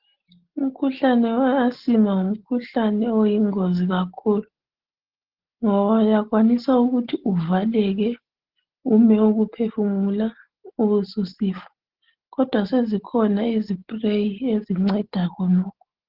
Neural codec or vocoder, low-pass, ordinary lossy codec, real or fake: none; 5.4 kHz; Opus, 16 kbps; real